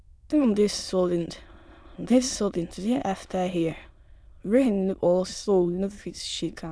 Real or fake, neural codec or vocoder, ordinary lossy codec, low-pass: fake; autoencoder, 22.05 kHz, a latent of 192 numbers a frame, VITS, trained on many speakers; none; none